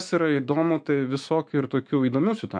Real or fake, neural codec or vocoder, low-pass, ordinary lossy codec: fake; autoencoder, 48 kHz, 128 numbers a frame, DAC-VAE, trained on Japanese speech; 9.9 kHz; MP3, 64 kbps